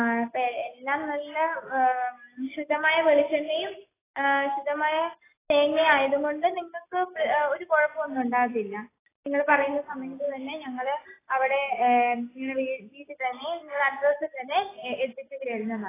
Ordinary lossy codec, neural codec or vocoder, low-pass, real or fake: AAC, 16 kbps; none; 3.6 kHz; real